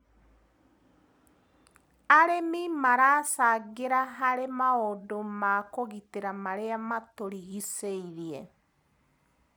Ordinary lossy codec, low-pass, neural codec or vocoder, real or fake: none; none; none; real